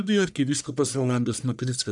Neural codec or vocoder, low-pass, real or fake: codec, 44.1 kHz, 1.7 kbps, Pupu-Codec; 10.8 kHz; fake